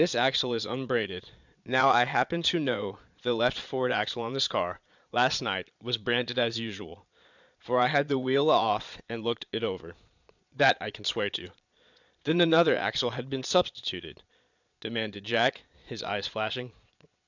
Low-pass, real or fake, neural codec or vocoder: 7.2 kHz; fake; vocoder, 22.05 kHz, 80 mel bands, Vocos